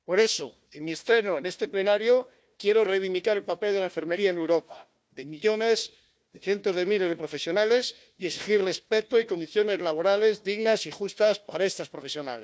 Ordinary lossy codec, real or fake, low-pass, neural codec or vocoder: none; fake; none; codec, 16 kHz, 1 kbps, FunCodec, trained on Chinese and English, 50 frames a second